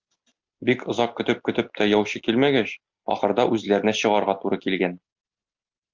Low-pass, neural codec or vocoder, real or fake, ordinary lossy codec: 7.2 kHz; none; real; Opus, 24 kbps